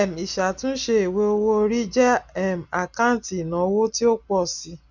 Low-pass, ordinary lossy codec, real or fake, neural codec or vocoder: 7.2 kHz; none; real; none